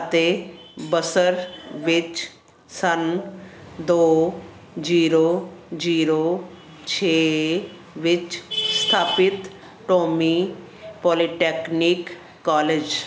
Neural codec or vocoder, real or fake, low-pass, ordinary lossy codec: none; real; none; none